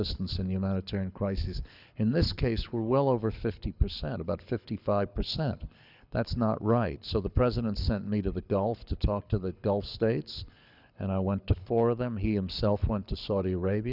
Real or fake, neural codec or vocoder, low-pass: fake; codec, 24 kHz, 6 kbps, HILCodec; 5.4 kHz